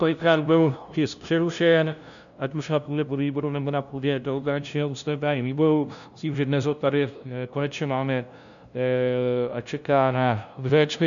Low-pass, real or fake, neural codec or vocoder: 7.2 kHz; fake; codec, 16 kHz, 0.5 kbps, FunCodec, trained on LibriTTS, 25 frames a second